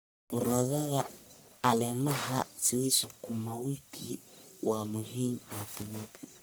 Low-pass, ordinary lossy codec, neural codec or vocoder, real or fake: none; none; codec, 44.1 kHz, 1.7 kbps, Pupu-Codec; fake